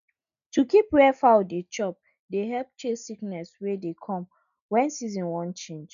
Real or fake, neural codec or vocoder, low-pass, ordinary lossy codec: real; none; 7.2 kHz; none